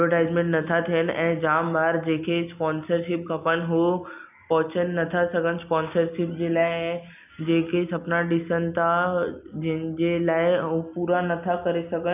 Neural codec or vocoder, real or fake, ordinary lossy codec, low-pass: none; real; none; 3.6 kHz